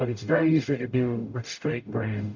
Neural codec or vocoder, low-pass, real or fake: codec, 44.1 kHz, 0.9 kbps, DAC; 7.2 kHz; fake